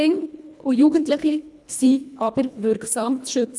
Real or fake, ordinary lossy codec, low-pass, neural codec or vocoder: fake; none; none; codec, 24 kHz, 1.5 kbps, HILCodec